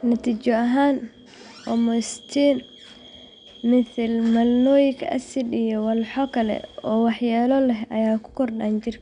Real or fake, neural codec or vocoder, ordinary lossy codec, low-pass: real; none; none; 9.9 kHz